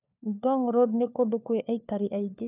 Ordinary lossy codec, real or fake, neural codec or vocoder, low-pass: none; fake; codec, 16 kHz, 4 kbps, FunCodec, trained on LibriTTS, 50 frames a second; 3.6 kHz